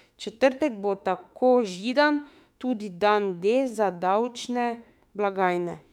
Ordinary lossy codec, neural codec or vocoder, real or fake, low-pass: none; autoencoder, 48 kHz, 32 numbers a frame, DAC-VAE, trained on Japanese speech; fake; 19.8 kHz